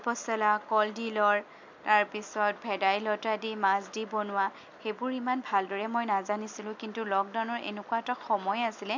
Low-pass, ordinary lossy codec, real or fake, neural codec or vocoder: 7.2 kHz; MP3, 64 kbps; real; none